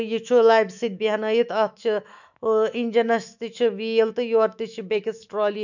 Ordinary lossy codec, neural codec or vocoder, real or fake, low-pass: none; autoencoder, 48 kHz, 128 numbers a frame, DAC-VAE, trained on Japanese speech; fake; 7.2 kHz